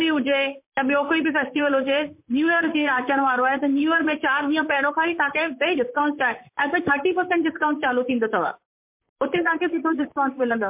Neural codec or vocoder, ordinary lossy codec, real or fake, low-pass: vocoder, 44.1 kHz, 128 mel bands, Pupu-Vocoder; MP3, 32 kbps; fake; 3.6 kHz